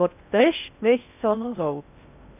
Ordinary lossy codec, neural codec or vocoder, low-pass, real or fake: none; codec, 16 kHz in and 24 kHz out, 0.6 kbps, FocalCodec, streaming, 4096 codes; 3.6 kHz; fake